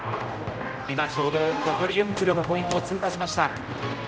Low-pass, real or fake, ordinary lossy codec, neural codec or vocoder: none; fake; none; codec, 16 kHz, 0.5 kbps, X-Codec, HuBERT features, trained on general audio